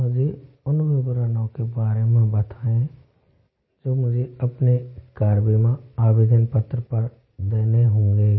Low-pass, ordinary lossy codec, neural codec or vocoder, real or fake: 7.2 kHz; MP3, 24 kbps; none; real